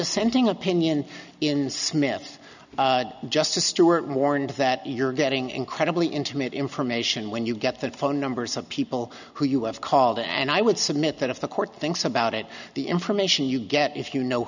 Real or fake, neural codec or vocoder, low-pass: real; none; 7.2 kHz